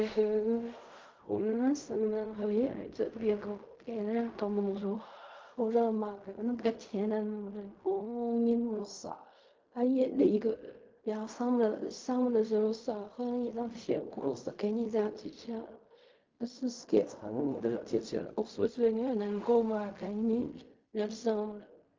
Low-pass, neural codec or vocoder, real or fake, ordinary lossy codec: 7.2 kHz; codec, 16 kHz in and 24 kHz out, 0.4 kbps, LongCat-Audio-Codec, fine tuned four codebook decoder; fake; Opus, 32 kbps